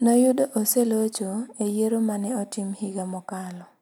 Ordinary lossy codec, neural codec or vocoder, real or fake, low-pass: none; none; real; none